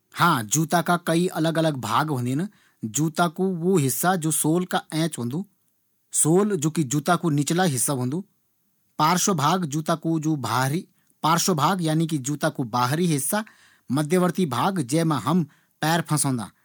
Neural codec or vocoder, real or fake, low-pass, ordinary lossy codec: none; real; none; none